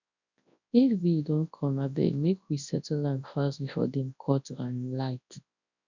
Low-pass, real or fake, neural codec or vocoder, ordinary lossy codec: 7.2 kHz; fake; codec, 24 kHz, 0.9 kbps, WavTokenizer, large speech release; none